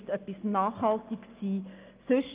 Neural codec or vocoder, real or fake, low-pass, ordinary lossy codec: none; real; 3.6 kHz; Opus, 32 kbps